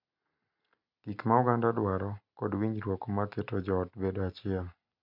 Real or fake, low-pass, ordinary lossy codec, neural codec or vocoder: real; 5.4 kHz; none; none